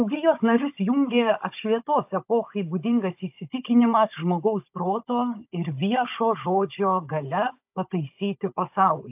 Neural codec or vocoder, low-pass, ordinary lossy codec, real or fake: codec, 16 kHz, 16 kbps, FunCodec, trained on Chinese and English, 50 frames a second; 3.6 kHz; AAC, 32 kbps; fake